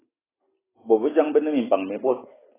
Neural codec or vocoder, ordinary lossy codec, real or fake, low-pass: none; AAC, 16 kbps; real; 3.6 kHz